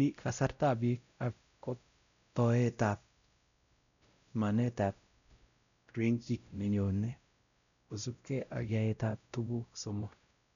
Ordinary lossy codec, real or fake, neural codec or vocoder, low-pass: MP3, 96 kbps; fake; codec, 16 kHz, 0.5 kbps, X-Codec, WavLM features, trained on Multilingual LibriSpeech; 7.2 kHz